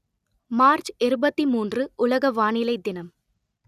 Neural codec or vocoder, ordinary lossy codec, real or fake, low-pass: none; none; real; 14.4 kHz